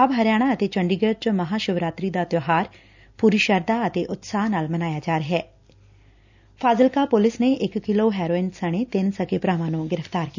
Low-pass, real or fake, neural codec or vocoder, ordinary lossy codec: 7.2 kHz; real; none; none